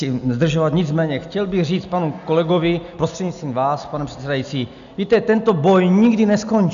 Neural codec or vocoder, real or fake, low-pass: none; real; 7.2 kHz